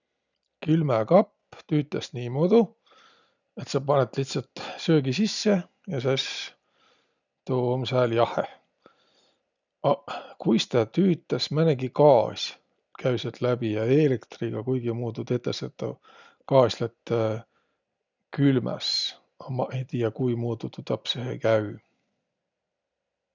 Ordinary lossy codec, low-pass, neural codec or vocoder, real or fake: none; 7.2 kHz; none; real